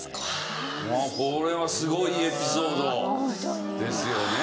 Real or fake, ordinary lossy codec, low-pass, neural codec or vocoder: real; none; none; none